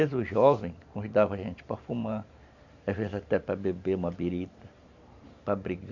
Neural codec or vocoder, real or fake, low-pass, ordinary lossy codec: vocoder, 44.1 kHz, 128 mel bands every 512 samples, BigVGAN v2; fake; 7.2 kHz; none